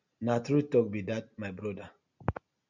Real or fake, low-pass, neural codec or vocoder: real; 7.2 kHz; none